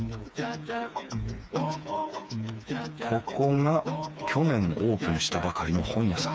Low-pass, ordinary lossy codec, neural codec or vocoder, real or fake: none; none; codec, 16 kHz, 4 kbps, FreqCodec, smaller model; fake